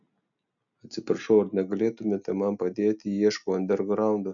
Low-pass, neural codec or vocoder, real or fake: 7.2 kHz; none; real